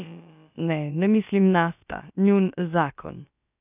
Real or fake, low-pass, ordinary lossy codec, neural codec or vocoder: fake; 3.6 kHz; none; codec, 16 kHz, about 1 kbps, DyCAST, with the encoder's durations